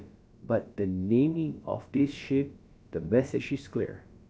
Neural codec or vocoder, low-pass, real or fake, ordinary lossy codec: codec, 16 kHz, about 1 kbps, DyCAST, with the encoder's durations; none; fake; none